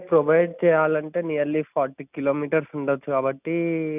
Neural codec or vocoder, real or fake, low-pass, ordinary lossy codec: none; real; 3.6 kHz; none